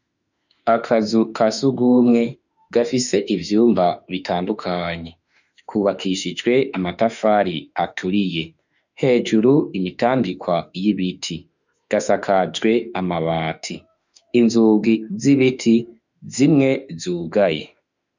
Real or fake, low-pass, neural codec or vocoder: fake; 7.2 kHz; autoencoder, 48 kHz, 32 numbers a frame, DAC-VAE, trained on Japanese speech